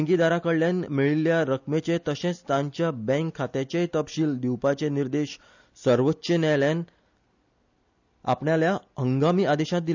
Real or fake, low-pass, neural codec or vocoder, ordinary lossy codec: real; 7.2 kHz; none; none